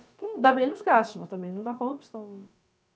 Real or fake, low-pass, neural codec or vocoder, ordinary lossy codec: fake; none; codec, 16 kHz, about 1 kbps, DyCAST, with the encoder's durations; none